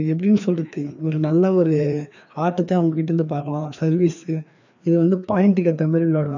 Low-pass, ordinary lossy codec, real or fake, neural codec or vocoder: 7.2 kHz; none; fake; codec, 16 kHz, 2 kbps, FreqCodec, larger model